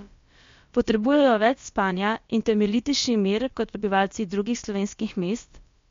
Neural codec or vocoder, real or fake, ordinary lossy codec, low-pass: codec, 16 kHz, about 1 kbps, DyCAST, with the encoder's durations; fake; MP3, 48 kbps; 7.2 kHz